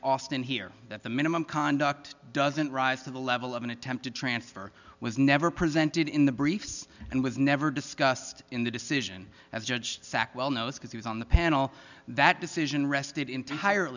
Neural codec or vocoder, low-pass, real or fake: none; 7.2 kHz; real